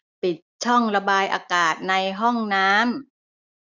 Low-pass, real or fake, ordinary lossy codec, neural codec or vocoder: 7.2 kHz; real; none; none